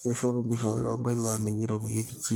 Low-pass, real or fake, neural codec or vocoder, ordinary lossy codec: none; fake; codec, 44.1 kHz, 1.7 kbps, Pupu-Codec; none